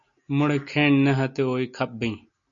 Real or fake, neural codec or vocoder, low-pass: real; none; 7.2 kHz